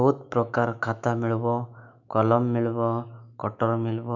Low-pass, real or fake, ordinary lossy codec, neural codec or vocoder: 7.2 kHz; fake; none; autoencoder, 48 kHz, 128 numbers a frame, DAC-VAE, trained on Japanese speech